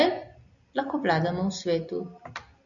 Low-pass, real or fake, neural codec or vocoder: 7.2 kHz; real; none